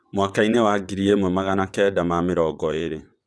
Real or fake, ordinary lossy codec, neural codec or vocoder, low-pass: fake; none; vocoder, 22.05 kHz, 80 mel bands, WaveNeXt; none